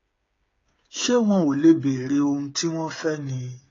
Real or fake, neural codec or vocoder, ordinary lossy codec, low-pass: fake; codec, 16 kHz, 8 kbps, FreqCodec, smaller model; AAC, 32 kbps; 7.2 kHz